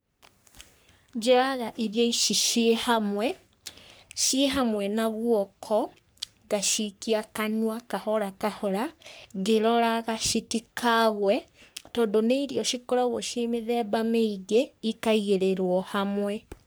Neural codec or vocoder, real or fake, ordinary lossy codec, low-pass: codec, 44.1 kHz, 3.4 kbps, Pupu-Codec; fake; none; none